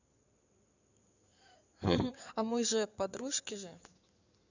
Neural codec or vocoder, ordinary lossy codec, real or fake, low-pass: codec, 16 kHz in and 24 kHz out, 2.2 kbps, FireRedTTS-2 codec; none; fake; 7.2 kHz